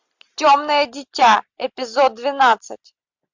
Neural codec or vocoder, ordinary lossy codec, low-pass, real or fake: none; MP3, 64 kbps; 7.2 kHz; real